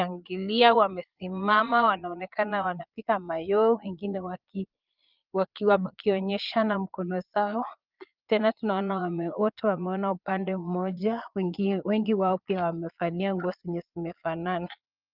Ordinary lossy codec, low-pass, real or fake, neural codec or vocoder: Opus, 32 kbps; 5.4 kHz; fake; vocoder, 22.05 kHz, 80 mel bands, Vocos